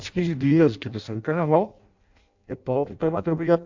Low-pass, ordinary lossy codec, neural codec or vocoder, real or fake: 7.2 kHz; AAC, 48 kbps; codec, 16 kHz in and 24 kHz out, 0.6 kbps, FireRedTTS-2 codec; fake